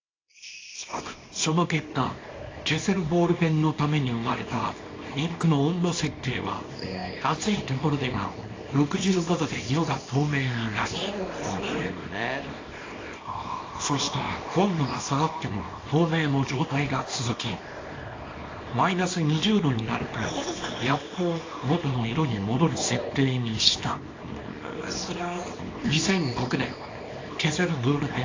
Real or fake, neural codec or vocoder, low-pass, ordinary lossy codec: fake; codec, 24 kHz, 0.9 kbps, WavTokenizer, small release; 7.2 kHz; AAC, 32 kbps